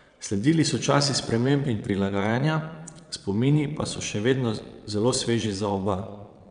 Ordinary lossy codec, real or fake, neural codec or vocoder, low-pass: none; fake; vocoder, 22.05 kHz, 80 mel bands, Vocos; 9.9 kHz